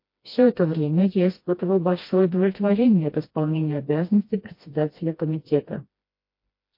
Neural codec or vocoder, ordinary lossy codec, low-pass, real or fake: codec, 16 kHz, 1 kbps, FreqCodec, smaller model; MP3, 32 kbps; 5.4 kHz; fake